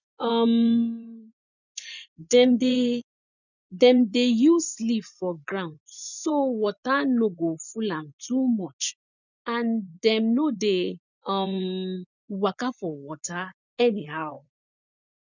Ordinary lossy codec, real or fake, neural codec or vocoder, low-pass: none; fake; vocoder, 24 kHz, 100 mel bands, Vocos; 7.2 kHz